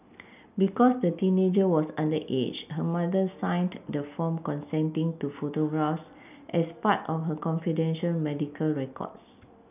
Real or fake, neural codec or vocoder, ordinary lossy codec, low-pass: real; none; none; 3.6 kHz